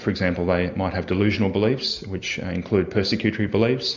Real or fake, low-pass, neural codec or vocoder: real; 7.2 kHz; none